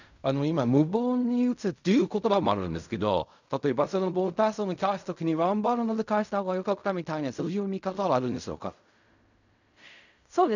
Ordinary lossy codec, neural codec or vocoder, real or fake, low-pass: none; codec, 16 kHz in and 24 kHz out, 0.4 kbps, LongCat-Audio-Codec, fine tuned four codebook decoder; fake; 7.2 kHz